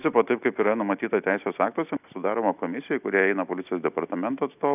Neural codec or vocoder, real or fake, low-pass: none; real; 3.6 kHz